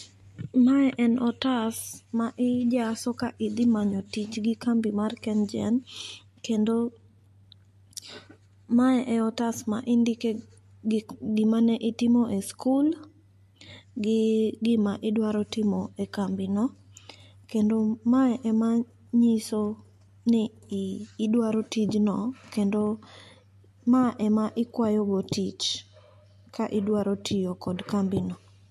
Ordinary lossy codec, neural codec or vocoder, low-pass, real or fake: MP3, 64 kbps; none; 14.4 kHz; real